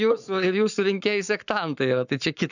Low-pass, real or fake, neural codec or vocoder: 7.2 kHz; fake; codec, 44.1 kHz, 7.8 kbps, Pupu-Codec